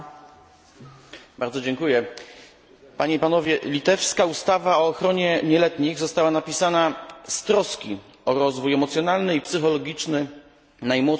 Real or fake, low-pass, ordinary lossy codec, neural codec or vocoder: real; none; none; none